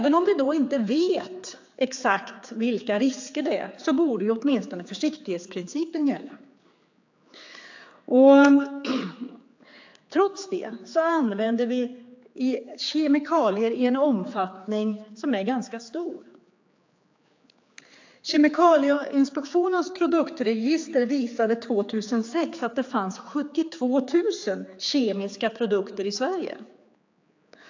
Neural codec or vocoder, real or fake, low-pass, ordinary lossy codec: codec, 16 kHz, 4 kbps, X-Codec, HuBERT features, trained on general audio; fake; 7.2 kHz; AAC, 48 kbps